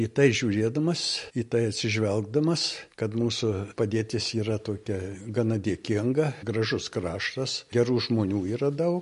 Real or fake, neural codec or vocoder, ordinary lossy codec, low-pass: real; none; MP3, 48 kbps; 10.8 kHz